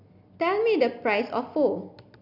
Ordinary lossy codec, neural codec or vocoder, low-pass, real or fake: none; none; 5.4 kHz; real